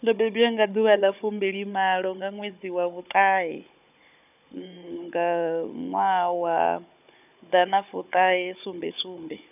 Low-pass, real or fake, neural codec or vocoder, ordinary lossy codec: 3.6 kHz; fake; codec, 16 kHz, 16 kbps, FunCodec, trained on Chinese and English, 50 frames a second; none